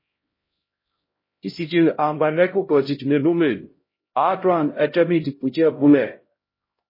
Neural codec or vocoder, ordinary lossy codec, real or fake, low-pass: codec, 16 kHz, 0.5 kbps, X-Codec, HuBERT features, trained on LibriSpeech; MP3, 24 kbps; fake; 5.4 kHz